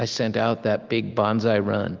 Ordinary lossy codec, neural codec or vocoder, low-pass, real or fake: Opus, 32 kbps; none; 7.2 kHz; real